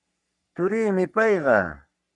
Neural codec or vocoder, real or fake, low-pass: codec, 44.1 kHz, 3.4 kbps, Pupu-Codec; fake; 10.8 kHz